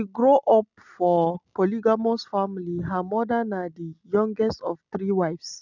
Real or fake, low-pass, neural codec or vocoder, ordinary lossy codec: real; 7.2 kHz; none; none